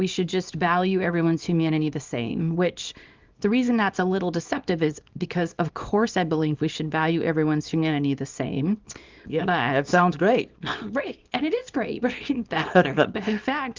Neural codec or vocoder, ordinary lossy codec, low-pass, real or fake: codec, 24 kHz, 0.9 kbps, WavTokenizer, small release; Opus, 24 kbps; 7.2 kHz; fake